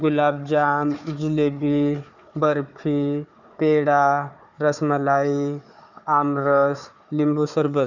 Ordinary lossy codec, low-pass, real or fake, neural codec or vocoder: none; 7.2 kHz; fake; codec, 16 kHz, 4 kbps, FunCodec, trained on Chinese and English, 50 frames a second